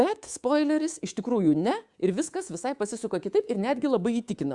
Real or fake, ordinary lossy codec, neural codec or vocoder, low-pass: fake; Opus, 64 kbps; codec, 24 kHz, 3.1 kbps, DualCodec; 10.8 kHz